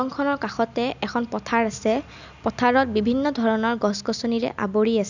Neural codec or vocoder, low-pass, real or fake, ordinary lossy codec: none; 7.2 kHz; real; none